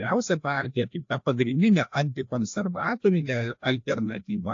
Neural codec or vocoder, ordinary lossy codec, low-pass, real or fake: codec, 16 kHz, 1 kbps, FreqCodec, larger model; AAC, 48 kbps; 7.2 kHz; fake